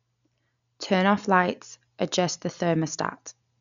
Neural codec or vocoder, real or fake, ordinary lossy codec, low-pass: none; real; none; 7.2 kHz